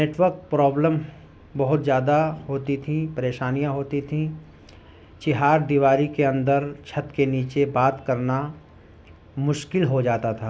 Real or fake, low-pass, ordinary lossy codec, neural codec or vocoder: real; none; none; none